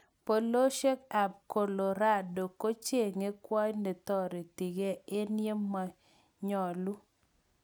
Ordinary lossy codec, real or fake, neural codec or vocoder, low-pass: none; real; none; none